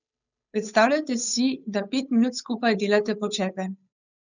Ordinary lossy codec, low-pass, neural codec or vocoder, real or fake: none; 7.2 kHz; codec, 16 kHz, 8 kbps, FunCodec, trained on Chinese and English, 25 frames a second; fake